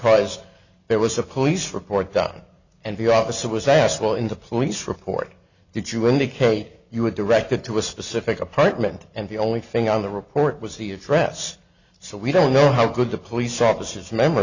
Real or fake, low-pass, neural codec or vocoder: real; 7.2 kHz; none